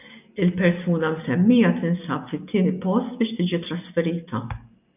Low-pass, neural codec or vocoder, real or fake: 3.6 kHz; none; real